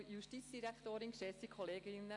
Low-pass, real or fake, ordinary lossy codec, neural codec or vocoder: 10.8 kHz; real; AAC, 48 kbps; none